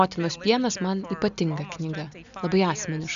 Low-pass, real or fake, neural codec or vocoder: 7.2 kHz; real; none